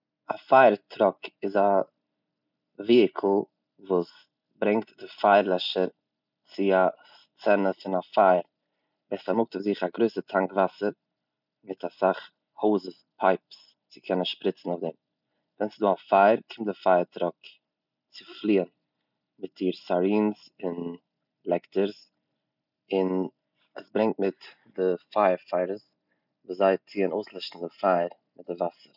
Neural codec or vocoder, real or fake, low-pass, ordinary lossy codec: none; real; 5.4 kHz; none